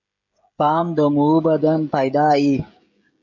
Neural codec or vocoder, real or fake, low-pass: codec, 16 kHz, 16 kbps, FreqCodec, smaller model; fake; 7.2 kHz